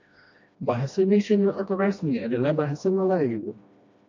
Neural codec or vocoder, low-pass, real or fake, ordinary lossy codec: codec, 16 kHz, 1 kbps, FreqCodec, smaller model; 7.2 kHz; fake; MP3, 48 kbps